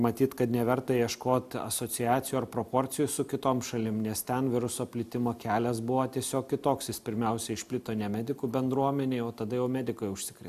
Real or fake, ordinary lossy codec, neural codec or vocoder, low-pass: real; MP3, 96 kbps; none; 14.4 kHz